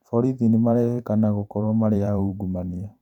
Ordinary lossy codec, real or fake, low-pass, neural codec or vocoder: none; fake; 19.8 kHz; vocoder, 44.1 kHz, 128 mel bands every 512 samples, BigVGAN v2